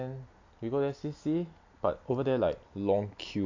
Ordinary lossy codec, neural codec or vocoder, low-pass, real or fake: AAC, 48 kbps; none; 7.2 kHz; real